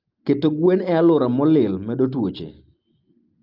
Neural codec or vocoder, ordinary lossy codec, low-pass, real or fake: none; Opus, 24 kbps; 5.4 kHz; real